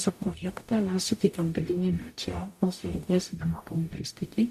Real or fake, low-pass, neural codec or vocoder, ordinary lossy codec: fake; 14.4 kHz; codec, 44.1 kHz, 0.9 kbps, DAC; MP3, 64 kbps